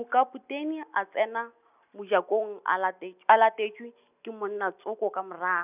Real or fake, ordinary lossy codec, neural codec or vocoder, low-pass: real; none; none; 3.6 kHz